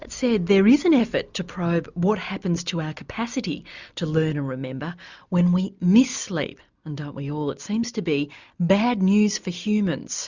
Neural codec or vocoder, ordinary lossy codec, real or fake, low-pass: none; Opus, 64 kbps; real; 7.2 kHz